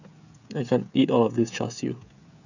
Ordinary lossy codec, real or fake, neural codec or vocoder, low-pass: none; fake; codec, 16 kHz, 16 kbps, FreqCodec, smaller model; 7.2 kHz